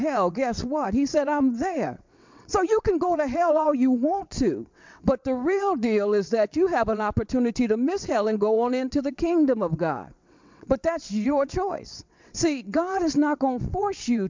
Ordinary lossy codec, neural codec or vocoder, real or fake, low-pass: MP3, 64 kbps; vocoder, 22.05 kHz, 80 mel bands, WaveNeXt; fake; 7.2 kHz